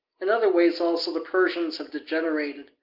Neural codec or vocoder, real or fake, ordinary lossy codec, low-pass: none; real; Opus, 24 kbps; 5.4 kHz